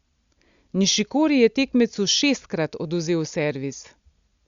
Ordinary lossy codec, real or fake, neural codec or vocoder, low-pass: Opus, 64 kbps; real; none; 7.2 kHz